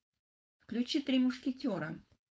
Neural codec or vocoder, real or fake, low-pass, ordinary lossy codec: codec, 16 kHz, 4.8 kbps, FACodec; fake; none; none